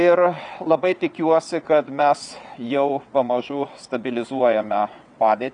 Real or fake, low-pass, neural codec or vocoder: fake; 9.9 kHz; vocoder, 22.05 kHz, 80 mel bands, WaveNeXt